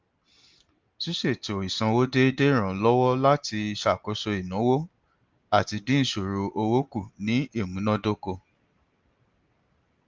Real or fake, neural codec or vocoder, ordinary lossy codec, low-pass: real; none; Opus, 32 kbps; 7.2 kHz